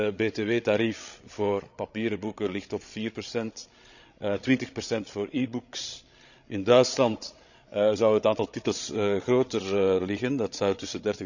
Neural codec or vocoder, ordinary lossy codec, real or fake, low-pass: codec, 16 kHz, 16 kbps, FreqCodec, larger model; none; fake; 7.2 kHz